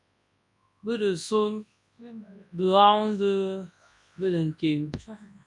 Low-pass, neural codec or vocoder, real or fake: 10.8 kHz; codec, 24 kHz, 0.9 kbps, WavTokenizer, large speech release; fake